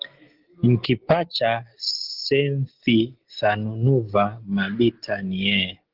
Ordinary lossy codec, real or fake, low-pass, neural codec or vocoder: Opus, 16 kbps; real; 5.4 kHz; none